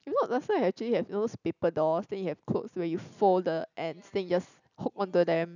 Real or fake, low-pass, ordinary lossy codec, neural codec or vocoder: real; 7.2 kHz; none; none